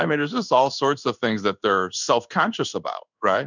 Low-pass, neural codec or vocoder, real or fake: 7.2 kHz; codec, 16 kHz in and 24 kHz out, 1 kbps, XY-Tokenizer; fake